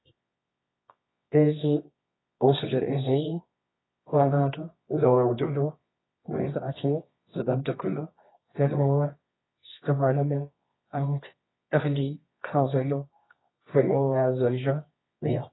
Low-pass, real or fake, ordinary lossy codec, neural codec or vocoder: 7.2 kHz; fake; AAC, 16 kbps; codec, 24 kHz, 0.9 kbps, WavTokenizer, medium music audio release